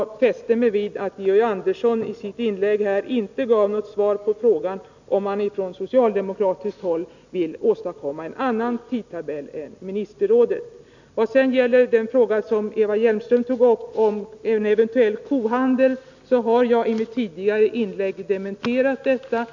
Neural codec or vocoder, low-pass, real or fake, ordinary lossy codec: none; 7.2 kHz; real; none